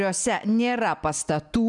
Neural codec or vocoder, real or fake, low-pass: none; real; 10.8 kHz